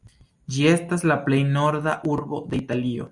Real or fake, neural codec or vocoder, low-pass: real; none; 10.8 kHz